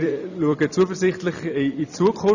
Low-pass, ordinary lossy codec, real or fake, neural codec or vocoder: 7.2 kHz; none; fake; vocoder, 44.1 kHz, 128 mel bands every 256 samples, BigVGAN v2